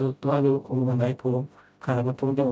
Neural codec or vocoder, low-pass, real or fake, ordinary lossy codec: codec, 16 kHz, 0.5 kbps, FreqCodec, smaller model; none; fake; none